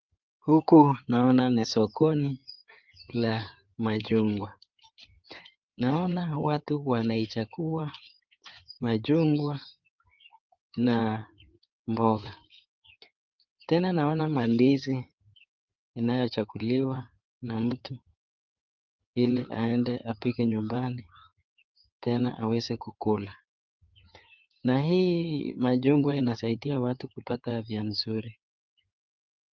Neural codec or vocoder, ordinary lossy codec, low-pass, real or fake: codec, 16 kHz in and 24 kHz out, 2.2 kbps, FireRedTTS-2 codec; Opus, 24 kbps; 7.2 kHz; fake